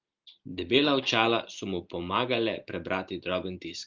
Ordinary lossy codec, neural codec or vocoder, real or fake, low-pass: Opus, 24 kbps; none; real; 7.2 kHz